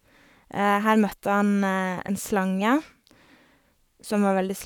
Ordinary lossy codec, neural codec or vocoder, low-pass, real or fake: none; codec, 44.1 kHz, 7.8 kbps, Pupu-Codec; 19.8 kHz; fake